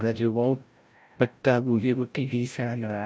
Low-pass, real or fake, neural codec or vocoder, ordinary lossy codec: none; fake; codec, 16 kHz, 0.5 kbps, FreqCodec, larger model; none